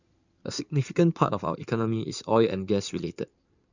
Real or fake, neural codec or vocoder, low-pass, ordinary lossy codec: fake; codec, 16 kHz in and 24 kHz out, 2.2 kbps, FireRedTTS-2 codec; 7.2 kHz; none